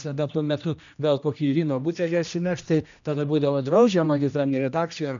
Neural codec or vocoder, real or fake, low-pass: codec, 16 kHz, 1 kbps, X-Codec, HuBERT features, trained on general audio; fake; 7.2 kHz